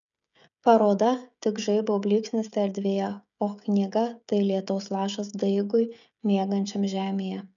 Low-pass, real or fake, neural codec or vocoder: 7.2 kHz; fake; codec, 16 kHz, 16 kbps, FreqCodec, smaller model